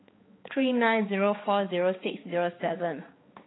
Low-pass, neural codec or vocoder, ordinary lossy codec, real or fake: 7.2 kHz; codec, 16 kHz, 4 kbps, X-Codec, HuBERT features, trained on balanced general audio; AAC, 16 kbps; fake